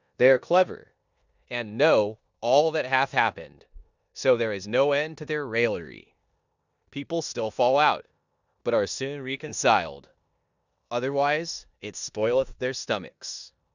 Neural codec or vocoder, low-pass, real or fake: codec, 16 kHz in and 24 kHz out, 0.9 kbps, LongCat-Audio-Codec, four codebook decoder; 7.2 kHz; fake